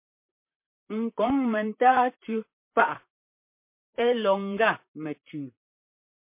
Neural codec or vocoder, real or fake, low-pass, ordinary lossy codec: vocoder, 44.1 kHz, 128 mel bands, Pupu-Vocoder; fake; 3.6 kHz; MP3, 24 kbps